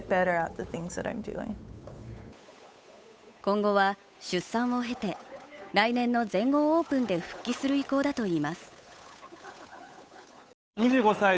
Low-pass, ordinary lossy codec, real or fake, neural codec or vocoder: none; none; fake; codec, 16 kHz, 8 kbps, FunCodec, trained on Chinese and English, 25 frames a second